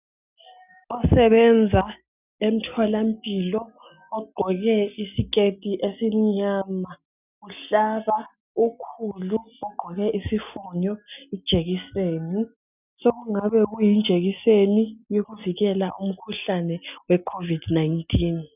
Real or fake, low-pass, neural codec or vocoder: real; 3.6 kHz; none